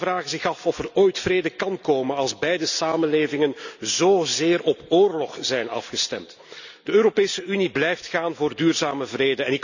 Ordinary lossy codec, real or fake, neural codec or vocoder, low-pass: none; real; none; 7.2 kHz